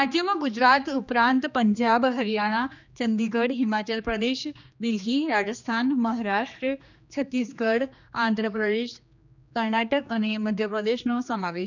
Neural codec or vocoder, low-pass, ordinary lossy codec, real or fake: codec, 16 kHz, 2 kbps, X-Codec, HuBERT features, trained on general audio; 7.2 kHz; none; fake